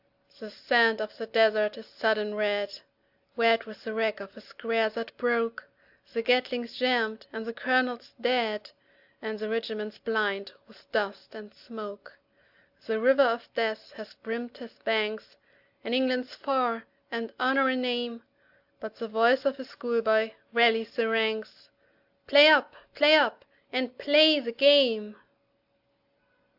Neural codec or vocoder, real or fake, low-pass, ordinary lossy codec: none; real; 5.4 kHz; AAC, 48 kbps